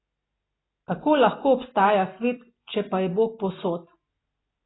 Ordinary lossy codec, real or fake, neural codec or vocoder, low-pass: AAC, 16 kbps; real; none; 7.2 kHz